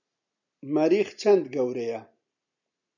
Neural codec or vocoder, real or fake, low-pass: none; real; 7.2 kHz